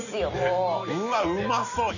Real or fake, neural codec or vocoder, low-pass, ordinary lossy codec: real; none; 7.2 kHz; none